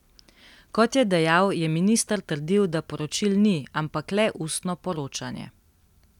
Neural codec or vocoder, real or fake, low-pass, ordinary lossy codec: none; real; 19.8 kHz; none